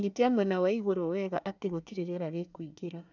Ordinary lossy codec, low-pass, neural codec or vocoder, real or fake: none; 7.2 kHz; codec, 24 kHz, 1 kbps, SNAC; fake